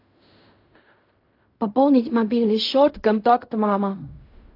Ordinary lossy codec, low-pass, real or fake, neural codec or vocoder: AAC, 32 kbps; 5.4 kHz; fake; codec, 16 kHz in and 24 kHz out, 0.4 kbps, LongCat-Audio-Codec, fine tuned four codebook decoder